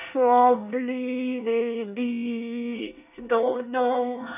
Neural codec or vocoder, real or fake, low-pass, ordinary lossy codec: codec, 24 kHz, 1 kbps, SNAC; fake; 3.6 kHz; none